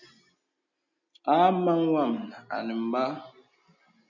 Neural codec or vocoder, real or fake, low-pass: none; real; 7.2 kHz